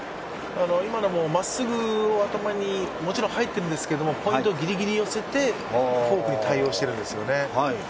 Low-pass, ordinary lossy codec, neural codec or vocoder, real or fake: none; none; none; real